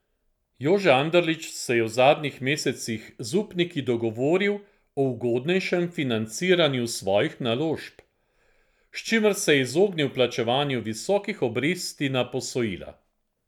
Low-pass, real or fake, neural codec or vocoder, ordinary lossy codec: 19.8 kHz; real; none; none